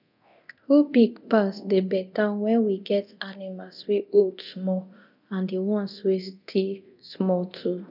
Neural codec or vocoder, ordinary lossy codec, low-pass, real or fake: codec, 24 kHz, 0.9 kbps, DualCodec; none; 5.4 kHz; fake